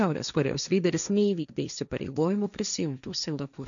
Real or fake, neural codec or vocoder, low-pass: fake; codec, 16 kHz, 1.1 kbps, Voila-Tokenizer; 7.2 kHz